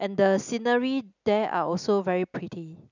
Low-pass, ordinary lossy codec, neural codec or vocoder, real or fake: 7.2 kHz; none; none; real